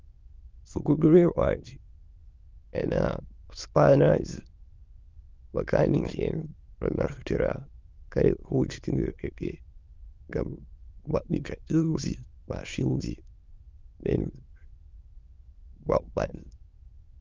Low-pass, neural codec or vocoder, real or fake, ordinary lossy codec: 7.2 kHz; autoencoder, 22.05 kHz, a latent of 192 numbers a frame, VITS, trained on many speakers; fake; Opus, 32 kbps